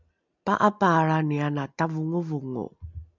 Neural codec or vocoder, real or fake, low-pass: none; real; 7.2 kHz